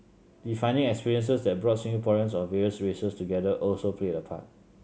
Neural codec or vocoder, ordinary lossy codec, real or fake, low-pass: none; none; real; none